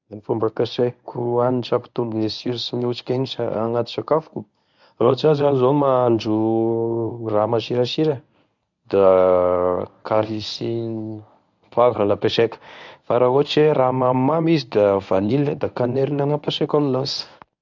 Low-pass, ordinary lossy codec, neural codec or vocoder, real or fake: 7.2 kHz; none; codec, 24 kHz, 0.9 kbps, WavTokenizer, medium speech release version 1; fake